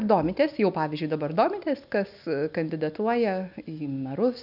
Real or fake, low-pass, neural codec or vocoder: real; 5.4 kHz; none